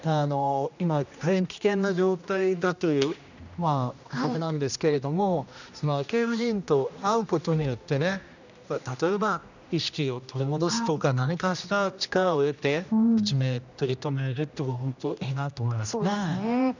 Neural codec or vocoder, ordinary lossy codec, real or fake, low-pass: codec, 16 kHz, 1 kbps, X-Codec, HuBERT features, trained on general audio; none; fake; 7.2 kHz